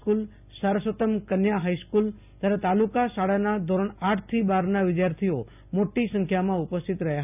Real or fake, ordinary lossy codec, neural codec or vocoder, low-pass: real; none; none; 3.6 kHz